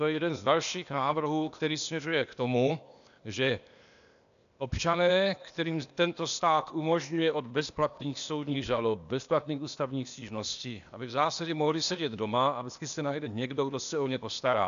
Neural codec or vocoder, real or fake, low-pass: codec, 16 kHz, 0.8 kbps, ZipCodec; fake; 7.2 kHz